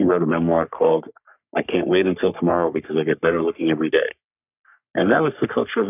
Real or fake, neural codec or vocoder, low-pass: fake; codec, 44.1 kHz, 3.4 kbps, Pupu-Codec; 3.6 kHz